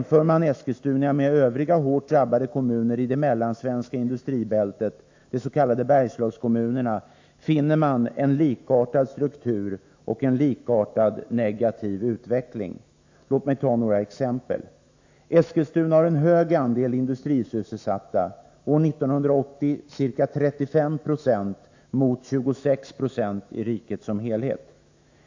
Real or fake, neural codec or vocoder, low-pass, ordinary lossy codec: fake; autoencoder, 48 kHz, 128 numbers a frame, DAC-VAE, trained on Japanese speech; 7.2 kHz; AAC, 48 kbps